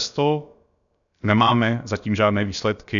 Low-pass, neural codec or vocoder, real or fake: 7.2 kHz; codec, 16 kHz, about 1 kbps, DyCAST, with the encoder's durations; fake